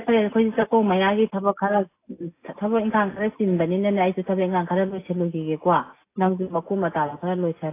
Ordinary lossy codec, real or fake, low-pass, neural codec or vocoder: AAC, 24 kbps; real; 3.6 kHz; none